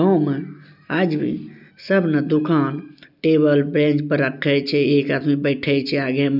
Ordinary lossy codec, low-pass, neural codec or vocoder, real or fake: none; 5.4 kHz; none; real